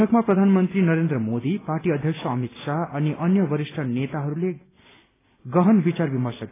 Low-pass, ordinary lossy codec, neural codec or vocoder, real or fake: 3.6 kHz; AAC, 16 kbps; none; real